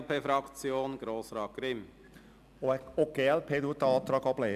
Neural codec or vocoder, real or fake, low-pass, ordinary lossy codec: none; real; 14.4 kHz; none